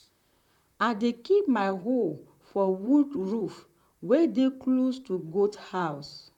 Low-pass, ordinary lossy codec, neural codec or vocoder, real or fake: 19.8 kHz; none; vocoder, 44.1 kHz, 128 mel bands, Pupu-Vocoder; fake